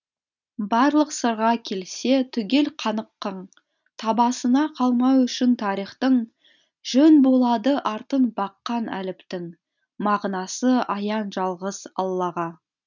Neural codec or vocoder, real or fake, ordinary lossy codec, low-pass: none; real; none; 7.2 kHz